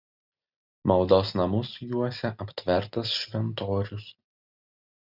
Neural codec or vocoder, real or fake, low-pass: none; real; 5.4 kHz